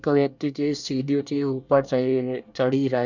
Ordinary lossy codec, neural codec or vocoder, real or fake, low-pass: none; codec, 24 kHz, 1 kbps, SNAC; fake; 7.2 kHz